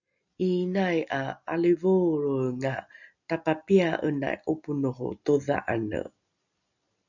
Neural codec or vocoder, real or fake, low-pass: none; real; 7.2 kHz